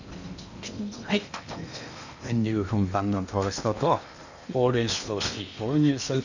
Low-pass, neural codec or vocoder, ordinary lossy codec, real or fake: 7.2 kHz; codec, 16 kHz in and 24 kHz out, 0.8 kbps, FocalCodec, streaming, 65536 codes; none; fake